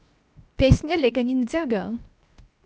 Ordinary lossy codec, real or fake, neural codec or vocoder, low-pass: none; fake; codec, 16 kHz, 0.7 kbps, FocalCodec; none